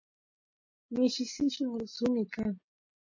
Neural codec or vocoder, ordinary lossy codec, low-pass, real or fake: none; MP3, 32 kbps; 7.2 kHz; real